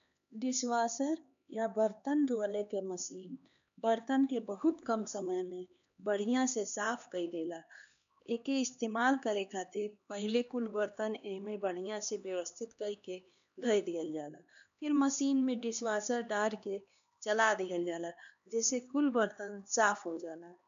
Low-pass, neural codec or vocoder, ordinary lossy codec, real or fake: 7.2 kHz; codec, 16 kHz, 2 kbps, X-Codec, HuBERT features, trained on LibriSpeech; MP3, 64 kbps; fake